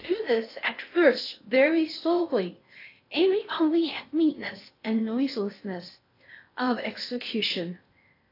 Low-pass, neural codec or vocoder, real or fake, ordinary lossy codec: 5.4 kHz; codec, 16 kHz in and 24 kHz out, 0.6 kbps, FocalCodec, streaming, 4096 codes; fake; AAC, 32 kbps